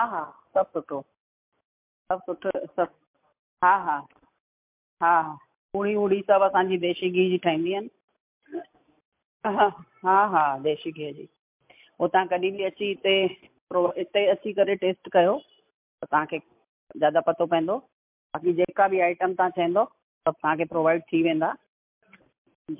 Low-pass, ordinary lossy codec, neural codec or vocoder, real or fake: 3.6 kHz; MP3, 32 kbps; none; real